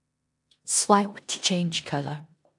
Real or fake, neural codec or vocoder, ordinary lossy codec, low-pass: fake; codec, 16 kHz in and 24 kHz out, 0.9 kbps, LongCat-Audio-Codec, four codebook decoder; AAC, 64 kbps; 10.8 kHz